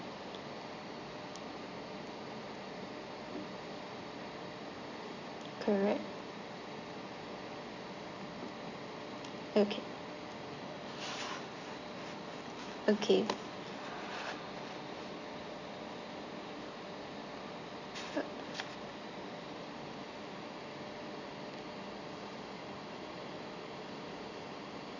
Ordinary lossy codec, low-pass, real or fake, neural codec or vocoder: none; 7.2 kHz; real; none